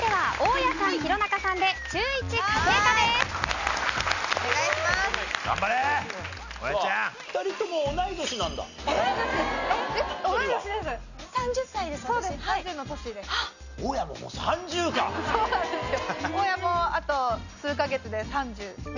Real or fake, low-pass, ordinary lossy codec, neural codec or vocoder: real; 7.2 kHz; none; none